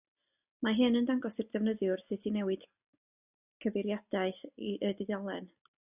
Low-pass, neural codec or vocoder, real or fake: 3.6 kHz; none; real